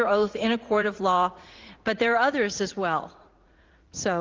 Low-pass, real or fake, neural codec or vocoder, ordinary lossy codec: 7.2 kHz; fake; vocoder, 44.1 kHz, 80 mel bands, Vocos; Opus, 32 kbps